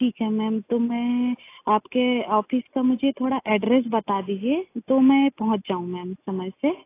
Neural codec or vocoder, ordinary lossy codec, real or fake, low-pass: none; AAC, 24 kbps; real; 3.6 kHz